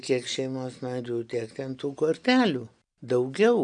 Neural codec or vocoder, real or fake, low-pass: none; real; 9.9 kHz